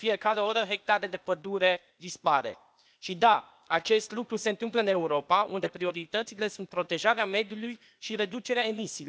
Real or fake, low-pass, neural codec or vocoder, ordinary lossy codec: fake; none; codec, 16 kHz, 0.8 kbps, ZipCodec; none